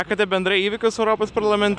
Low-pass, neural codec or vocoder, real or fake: 9.9 kHz; none; real